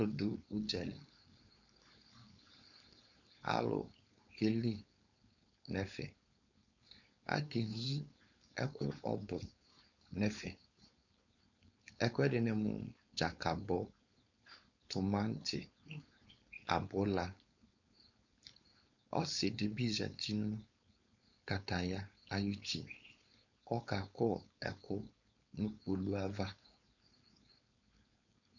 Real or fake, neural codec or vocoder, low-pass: fake; codec, 16 kHz, 4.8 kbps, FACodec; 7.2 kHz